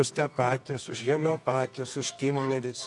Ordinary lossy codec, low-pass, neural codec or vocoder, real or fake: AAC, 64 kbps; 10.8 kHz; codec, 24 kHz, 0.9 kbps, WavTokenizer, medium music audio release; fake